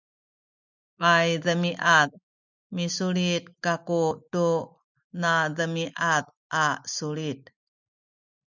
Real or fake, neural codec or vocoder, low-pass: real; none; 7.2 kHz